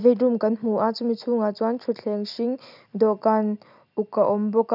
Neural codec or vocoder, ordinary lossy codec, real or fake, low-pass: none; none; real; 5.4 kHz